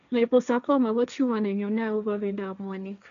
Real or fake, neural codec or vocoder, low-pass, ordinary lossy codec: fake; codec, 16 kHz, 1.1 kbps, Voila-Tokenizer; 7.2 kHz; none